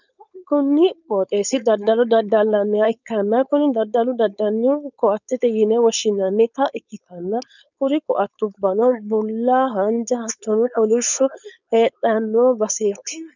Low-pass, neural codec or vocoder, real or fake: 7.2 kHz; codec, 16 kHz, 4.8 kbps, FACodec; fake